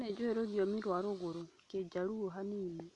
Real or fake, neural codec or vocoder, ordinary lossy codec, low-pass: real; none; none; 10.8 kHz